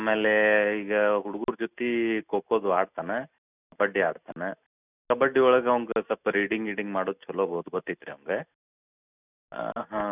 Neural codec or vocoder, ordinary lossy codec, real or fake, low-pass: none; none; real; 3.6 kHz